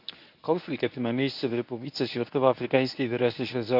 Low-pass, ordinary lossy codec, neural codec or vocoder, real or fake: 5.4 kHz; none; codec, 24 kHz, 0.9 kbps, WavTokenizer, medium speech release version 1; fake